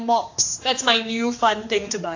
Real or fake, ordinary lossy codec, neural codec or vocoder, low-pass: fake; AAC, 48 kbps; codec, 16 kHz, 2 kbps, X-Codec, HuBERT features, trained on general audio; 7.2 kHz